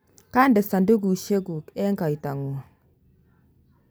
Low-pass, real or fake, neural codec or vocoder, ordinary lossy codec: none; real; none; none